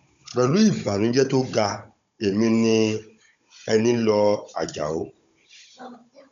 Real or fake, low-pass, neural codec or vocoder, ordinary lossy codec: fake; 7.2 kHz; codec, 16 kHz, 16 kbps, FunCodec, trained on Chinese and English, 50 frames a second; MP3, 64 kbps